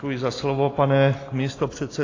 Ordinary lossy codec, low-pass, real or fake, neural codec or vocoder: AAC, 32 kbps; 7.2 kHz; real; none